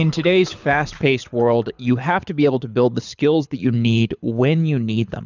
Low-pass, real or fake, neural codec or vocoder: 7.2 kHz; fake; codec, 24 kHz, 6 kbps, HILCodec